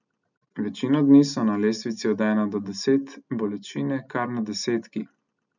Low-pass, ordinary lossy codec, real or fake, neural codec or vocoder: 7.2 kHz; none; real; none